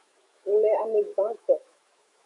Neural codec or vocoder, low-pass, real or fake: autoencoder, 48 kHz, 128 numbers a frame, DAC-VAE, trained on Japanese speech; 10.8 kHz; fake